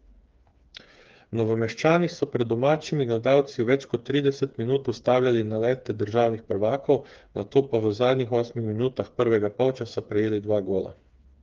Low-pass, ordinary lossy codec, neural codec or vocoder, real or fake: 7.2 kHz; Opus, 32 kbps; codec, 16 kHz, 4 kbps, FreqCodec, smaller model; fake